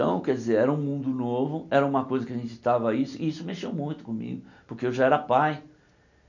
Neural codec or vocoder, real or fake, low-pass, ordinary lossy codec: none; real; 7.2 kHz; none